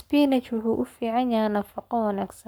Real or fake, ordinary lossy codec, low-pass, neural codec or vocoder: fake; none; none; codec, 44.1 kHz, 7.8 kbps, Pupu-Codec